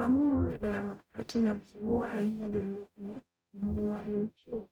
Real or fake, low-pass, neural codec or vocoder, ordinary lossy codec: fake; 19.8 kHz; codec, 44.1 kHz, 0.9 kbps, DAC; MP3, 96 kbps